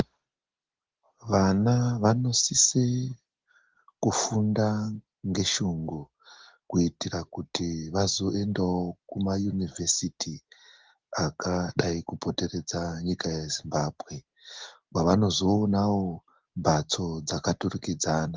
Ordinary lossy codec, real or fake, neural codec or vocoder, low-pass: Opus, 16 kbps; real; none; 7.2 kHz